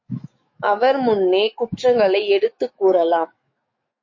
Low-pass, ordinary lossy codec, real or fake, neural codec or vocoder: 7.2 kHz; MP3, 32 kbps; fake; autoencoder, 48 kHz, 128 numbers a frame, DAC-VAE, trained on Japanese speech